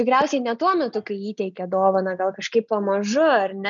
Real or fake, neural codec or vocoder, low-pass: real; none; 7.2 kHz